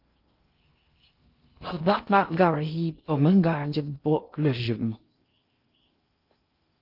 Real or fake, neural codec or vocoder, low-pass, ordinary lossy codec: fake; codec, 16 kHz in and 24 kHz out, 0.6 kbps, FocalCodec, streaming, 2048 codes; 5.4 kHz; Opus, 32 kbps